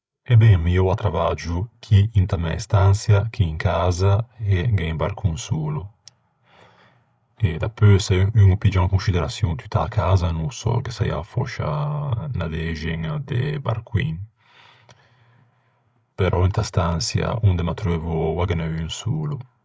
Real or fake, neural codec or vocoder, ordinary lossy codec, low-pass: fake; codec, 16 kHz, 16 kbps, FreqCodec, larger model; none; none